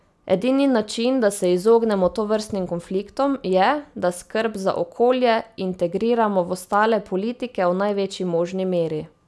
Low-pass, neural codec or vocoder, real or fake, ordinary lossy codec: none; none; real; none